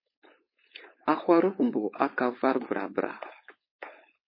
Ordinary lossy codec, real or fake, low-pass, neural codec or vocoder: MP3, 24 kbps; fake; 5.4 kHz; codec, 16 kHz, 4.8 kbps, FACodec